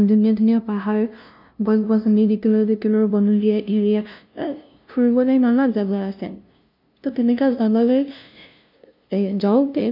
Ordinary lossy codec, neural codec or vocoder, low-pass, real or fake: none; codec, 16 kHz, 0.5 kbps, FunCodec, trained on LibriTTS, 25 frames a second; 5.4 kHz; fake